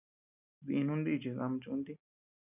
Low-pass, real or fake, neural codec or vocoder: 3.6 kHz; real; none